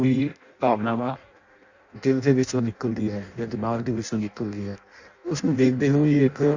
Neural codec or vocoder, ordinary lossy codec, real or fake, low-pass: codec, 16 kHz in and 24 kHz out, 0.6 kbps, FireRedTTS-2 codec; Opus, 64 kbps; fake; 7.2 kHz